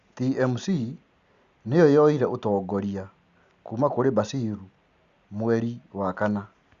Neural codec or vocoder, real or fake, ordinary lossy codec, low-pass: none; real; Opus, 64 kbps; 7.2 kHz